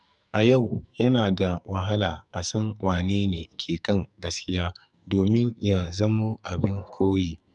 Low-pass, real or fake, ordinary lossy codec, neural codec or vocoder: 10.8 kHz; fake; none; codec, 44.1 kHz, 2.6 kbps, SNAC